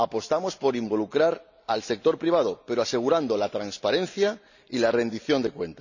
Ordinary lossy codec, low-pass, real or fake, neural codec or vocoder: none; 7.2 kHz; real; none